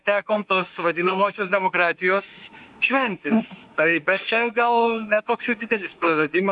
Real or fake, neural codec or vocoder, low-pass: fake; autoencoder, 48 kHz, 32 numbers a frame, DAC-VAE, trained on Japanese speech; 10.8 kHz